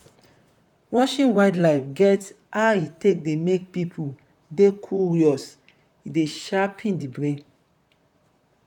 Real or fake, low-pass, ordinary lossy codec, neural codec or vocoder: fake; 19.8 kHz; none; vocoder, 44.1 kHz, 128 mel bands, Pupu-Vocoder